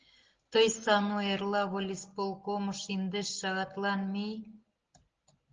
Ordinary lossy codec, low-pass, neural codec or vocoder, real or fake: Opus, 16 kbps; 7.2 kHz; none; real